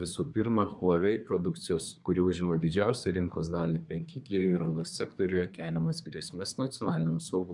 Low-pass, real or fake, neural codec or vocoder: 10.8 kHz; fake; codec, 24 kHz, 1 kbps, SNAC